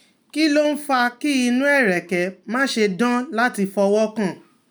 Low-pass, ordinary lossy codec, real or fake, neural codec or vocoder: none; none; real; none